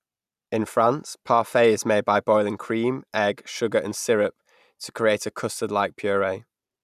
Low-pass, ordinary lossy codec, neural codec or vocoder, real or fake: 14.4 kHz; none; none; real